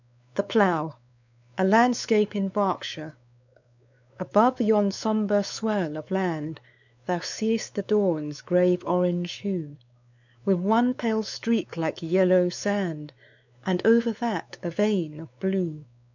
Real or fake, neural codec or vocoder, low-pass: fake; codec, 16 kHz, 4 kbps, X-Codec, WavLM features, trained on Multilingual LibriSpeech; 7.2 kHz